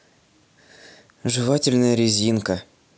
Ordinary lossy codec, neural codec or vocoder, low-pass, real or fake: none; none; none; real